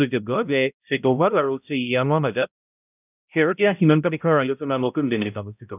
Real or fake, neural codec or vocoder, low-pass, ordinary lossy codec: fake; codec, 16 kHz, 0.5 kbps, X-Codec, HuBERT features, trained on balanced general audio; 3.6 kHz; none